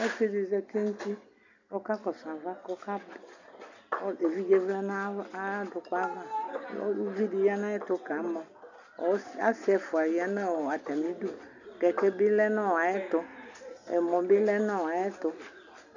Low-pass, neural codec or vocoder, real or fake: 7.2 kHz; none; real